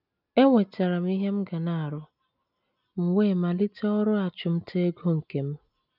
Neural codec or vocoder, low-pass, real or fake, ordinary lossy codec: none; 5.4 kHz; real; none